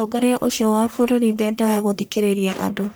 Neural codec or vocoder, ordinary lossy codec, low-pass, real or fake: codec, 44.1 kHz, 1.7 kbps, Pupu-Codec; none; none; fake